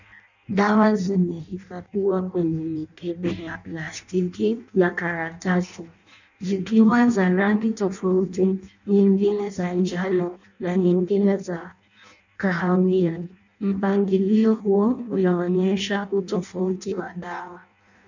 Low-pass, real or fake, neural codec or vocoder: 7.2 kHz; fake; codec, 16 kHz in and 24 kHz out, 0.6 kbps, FireRedTTS-2 codec